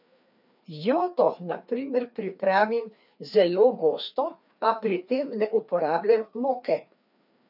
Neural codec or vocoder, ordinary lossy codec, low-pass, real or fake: codec, 16 kHz, 2 kbps, FreqCodec, larger model; none; 5.4 kHz; fake